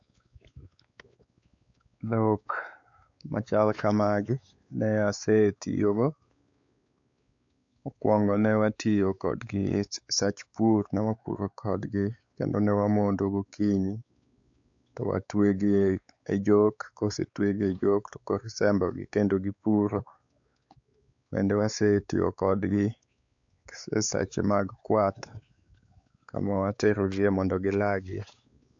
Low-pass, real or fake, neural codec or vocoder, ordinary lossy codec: 7.2 kHz; fake; codec, 16 kHz, 4 kbps, X-Codec, WavLM features, trained on Multilingual LibriSpeech; AAC, 64 kbps